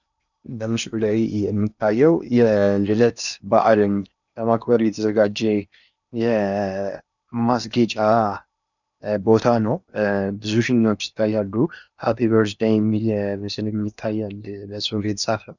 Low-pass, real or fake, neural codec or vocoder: 7.2 kHz; fake; codec, 16 kHz in and 24 kHz out, 0.8 kbps, FocalCodec, streaming, 65536 codes